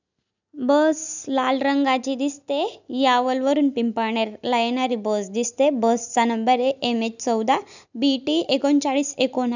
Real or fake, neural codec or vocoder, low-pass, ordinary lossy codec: real; none; 7.2 kHz; none